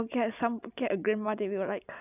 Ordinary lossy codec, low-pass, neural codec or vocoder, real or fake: none; 3.6 kHz; none; real